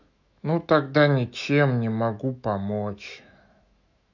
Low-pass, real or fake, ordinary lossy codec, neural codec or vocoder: 7.2 kHz; fake; none; autoencoder, 48 kHz, 128 numbers a frame, DAC-VAE, trained on Japanese speech